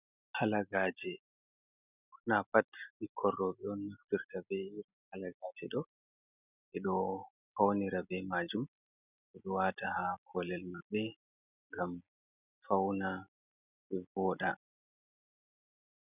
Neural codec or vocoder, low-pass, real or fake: none; 3.6 kHz; real